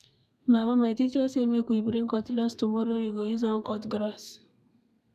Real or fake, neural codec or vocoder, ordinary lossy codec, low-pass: fake; codec, 32 kHz, 1.9 kbps, SNAC; none; 14.4 kHz